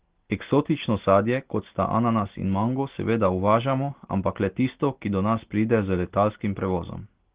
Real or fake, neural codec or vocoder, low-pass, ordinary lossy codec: real; none; 3.6 kHz; Opus, 16 kbps